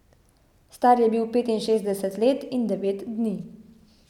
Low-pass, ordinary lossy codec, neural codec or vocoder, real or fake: 19.8 kHz; none; none; real